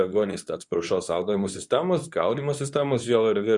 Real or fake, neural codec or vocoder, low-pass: fake; codec, 24 kHz, 0.9 kbps, WavTokenizer, medium speech release version 2; 10.8 kHz